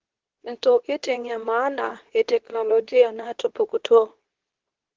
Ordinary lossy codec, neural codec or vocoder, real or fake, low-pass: Opus, 16 kbps; codec, 24 kHz, 0.9 kbps, WavTokenizer, medium speech release version 2; fake; 7.2 kHz